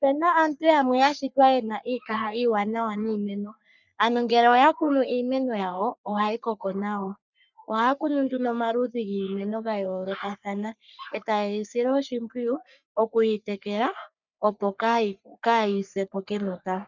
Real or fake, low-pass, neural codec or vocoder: fake; 7.2 kHz; codec, 44.1 kHz, 3.4 kbps, Pupu-Codec